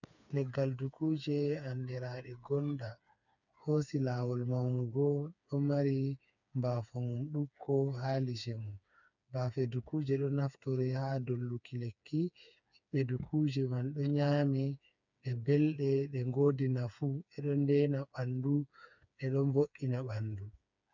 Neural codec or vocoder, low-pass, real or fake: codec, 16 kHz, 4 kbps, FreqCodec, smaller model; 7.2 kHz; fake